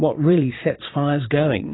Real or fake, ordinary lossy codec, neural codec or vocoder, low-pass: fake; AAC, 16 kbps; vocoder, 22.05 kHz, 80 mel bands, Vocos; 7.2 kHz